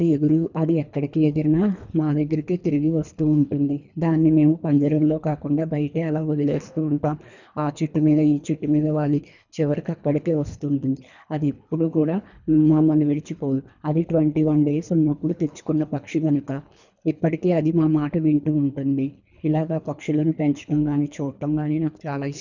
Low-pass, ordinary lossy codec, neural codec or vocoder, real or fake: 7.2 kHz; none; codec, 24 kHz, 3 kbps, HILCodec; fake